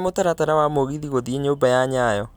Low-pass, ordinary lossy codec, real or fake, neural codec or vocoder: none; none; real; none